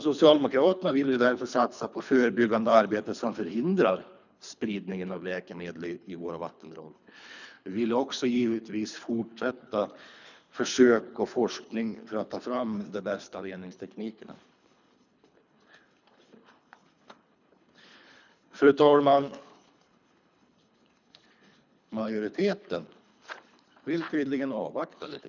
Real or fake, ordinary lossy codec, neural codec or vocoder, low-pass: fake; none; codec, 24 kHz, 3 kbps, HILCodec; 7.2 kHz